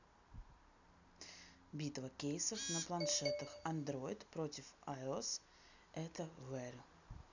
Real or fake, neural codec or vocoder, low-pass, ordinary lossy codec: real; none; 7.2 kHz; none